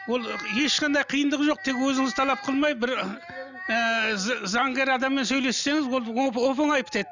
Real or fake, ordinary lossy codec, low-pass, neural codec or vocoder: real; none; 7.2 kHz; none